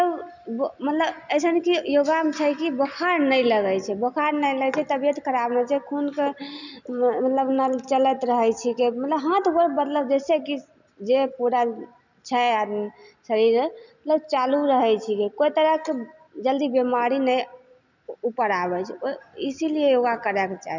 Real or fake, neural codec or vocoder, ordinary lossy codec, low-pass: real; none; none; 7.2 kHz